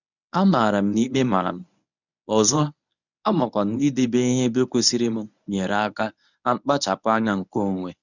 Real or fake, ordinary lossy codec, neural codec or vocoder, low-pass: fake; none; codec, 24 kHz, 0.9 kbps, WavTokenizer, medium speech release version 1; 7.2 kHz